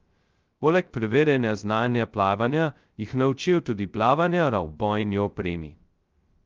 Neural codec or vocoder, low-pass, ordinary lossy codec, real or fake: codec, 16 kHz, 0.2 kbps, FocalCodec; 7.2 kHz; Opus, 32 kbps; fake